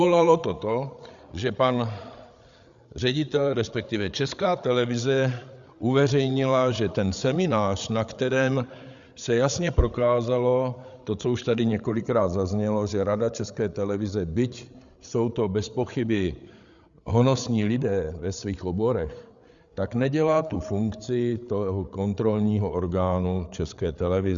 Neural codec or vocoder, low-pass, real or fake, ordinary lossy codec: codec, 16 kHz, 8 kbps, FreqCodec, larger model; 7.2 kHz; fake; Opus, 64 kbps